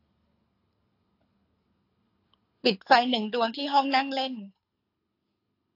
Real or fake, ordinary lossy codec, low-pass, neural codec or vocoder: fake; AAC, 32 kbps; 5.4 kHz; codec, 24 kHz, 6 kbps, HILCodec